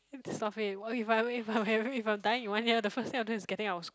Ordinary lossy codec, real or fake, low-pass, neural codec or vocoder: none; real; none; none